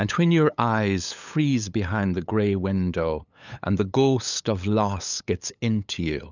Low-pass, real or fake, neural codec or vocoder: 7.2 kHz; fake; codec, 16 kHz, 8 kbps, FunCodec, trained on LibriTTS, 25 frames a second